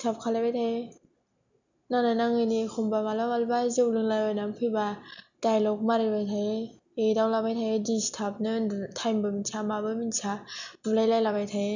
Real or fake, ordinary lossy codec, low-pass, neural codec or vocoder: real; none; 7.2 kHz; none